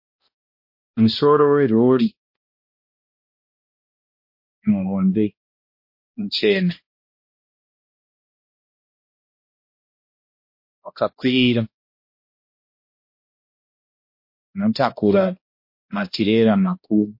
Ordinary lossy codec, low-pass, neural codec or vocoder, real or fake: MP3, 32 kbps; 5.4 kHz; codec, 16 kHz, 1 kbps, X-Codec, HuBERT features, trained on balanced general audio; fake